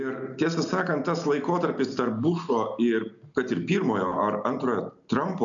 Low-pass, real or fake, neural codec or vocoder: 7.2 kHz; real; none